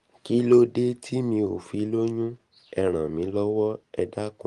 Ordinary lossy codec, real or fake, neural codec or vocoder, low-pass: Opus, 24 kbps; real; none; 10.8 kHz